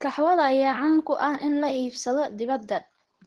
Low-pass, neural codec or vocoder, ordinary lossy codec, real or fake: 10.8 kHz; codec, 24 kHz, 0.9 kbps, WavTokenizer, medium speech release version 2; Opus, 16 kbps; fake